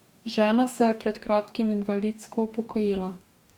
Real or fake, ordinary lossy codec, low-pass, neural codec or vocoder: fake; Opus, 64 kbps; 19.8 kHz; codec, 44.1 kHz, 2.6 kbps, DAC